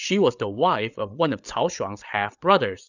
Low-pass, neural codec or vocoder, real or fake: 7.2 kHz; codec, 16 kHz, 8 kbps, FreqCodec, larger model; fake